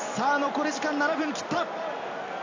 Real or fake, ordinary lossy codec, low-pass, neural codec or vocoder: real; AAC, 48 kbps; 7.2 kHz; none